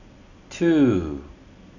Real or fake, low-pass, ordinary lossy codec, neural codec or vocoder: real; 7.2 kHz; none; none